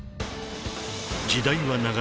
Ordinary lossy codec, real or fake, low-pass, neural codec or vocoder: none; real; none; none